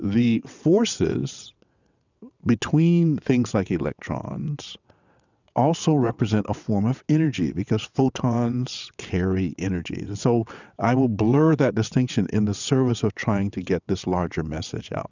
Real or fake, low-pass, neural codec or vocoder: fake; 7.2 kHz; vocoder, 22.05 kHz, 80 mel bands, WaveNeXt